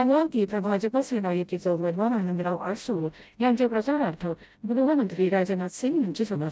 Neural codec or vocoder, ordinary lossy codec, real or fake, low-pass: codec, 16 kHz, 0.5 kbps, FreqCodec, smaller model; none; fake; none